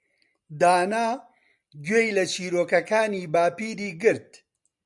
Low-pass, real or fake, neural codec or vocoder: 10.8 kHz; real; none